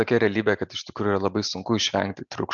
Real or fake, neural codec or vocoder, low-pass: real; none; 7.2 kHz